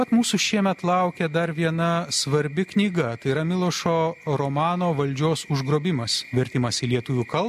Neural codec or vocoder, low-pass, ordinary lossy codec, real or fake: none; 14.4 kHz; MP3, 64 kbps; real